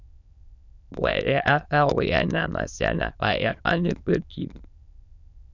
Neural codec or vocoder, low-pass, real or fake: autoencoder, 22.05 kHz, a latent of 192 numbers a frame, VITS, trained on many speakers; 7.2 kHz; fake